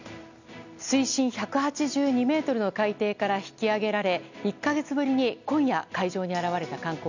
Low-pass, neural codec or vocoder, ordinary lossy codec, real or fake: 7.2 kHz; none; none; real